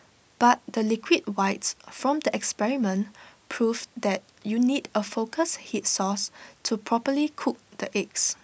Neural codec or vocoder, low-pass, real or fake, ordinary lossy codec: none; none; real; none